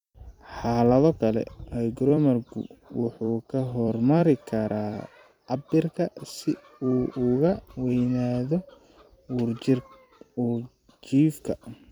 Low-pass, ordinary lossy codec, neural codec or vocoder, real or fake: 19.8 kHz; none; vocoder, 44.1 kHz, 128 mel bands every 256 samples, BigVGAN v2; fake